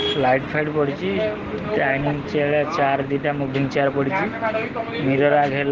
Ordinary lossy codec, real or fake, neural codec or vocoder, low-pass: Opus, 16 kbps; real; none; 7.2 kHz